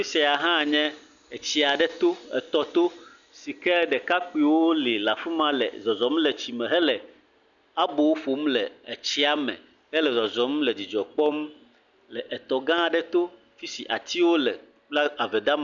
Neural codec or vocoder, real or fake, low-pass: none; real; 7.2 kHz